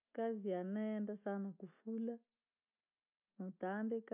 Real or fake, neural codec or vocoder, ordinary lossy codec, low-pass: real; none; none; 3.6 kHz